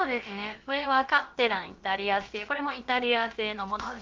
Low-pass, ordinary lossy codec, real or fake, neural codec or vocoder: 7.2 kHz; Opus, 32 kbps; fake; codec, 16 kHz, about 1 kbps, DyCAST, with the encoder's durations